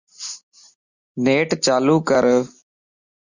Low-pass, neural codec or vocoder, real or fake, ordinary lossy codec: 7.2 kHz; none; real; Opus, 64 kbps